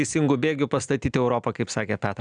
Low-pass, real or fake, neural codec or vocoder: 9.9 kHz; real; none